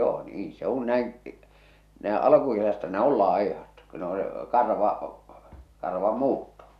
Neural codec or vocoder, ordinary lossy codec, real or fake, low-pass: none; none; real; 14.4 kHz